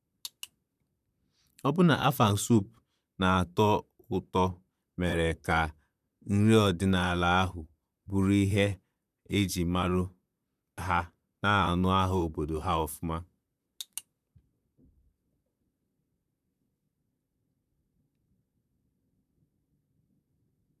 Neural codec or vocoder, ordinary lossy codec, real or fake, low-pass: vocoder, 44.1 kHz, 128 mel bands, Pupu-Vocoder; none; fake; 14.4 kHz